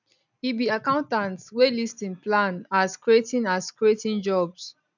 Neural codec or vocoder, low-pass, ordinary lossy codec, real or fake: none; 7.2 kHz; none; real